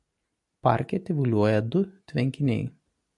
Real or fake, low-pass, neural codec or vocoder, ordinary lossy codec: real; 10.8 kHz; none; MP3, 64 kbps